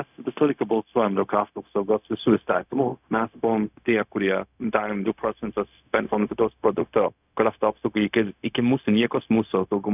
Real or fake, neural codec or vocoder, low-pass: fake; codec, 16 kHz, 0.4 kbps, LongCat-Audio-Codec; 3.6 kHz